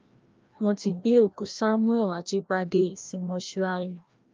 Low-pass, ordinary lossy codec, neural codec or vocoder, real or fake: 7.2 kHz; Opus, 24 kbps; codec, 16 kHz, 1 kbps, FreqCodec, larger model; fake